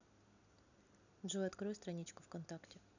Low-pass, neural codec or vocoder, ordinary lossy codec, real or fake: 7.2 kHz; none; none; real